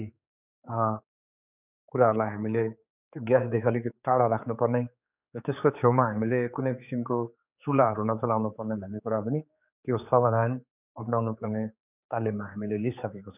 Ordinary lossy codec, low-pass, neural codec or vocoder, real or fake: none; 3.6 kHz; codec, 16 kHz, 4 kbps, X-Codec, HuBERT features, trained on general audio; fake